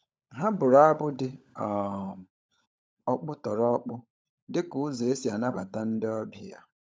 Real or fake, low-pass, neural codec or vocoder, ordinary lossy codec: fake; none; codec, 16 kHz, 16 kbps, FunCodec, trained on LibriTTS, 50 frames a second; none